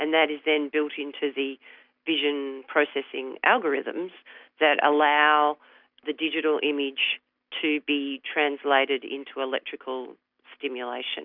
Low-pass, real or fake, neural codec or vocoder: 5.4 kHz; real; none